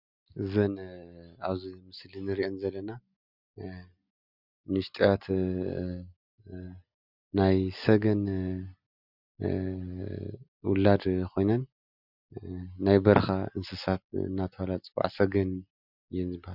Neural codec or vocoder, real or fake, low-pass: none; real; 5.4 kHz